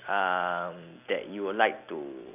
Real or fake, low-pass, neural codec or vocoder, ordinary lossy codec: real; 3.6 kHz; none; none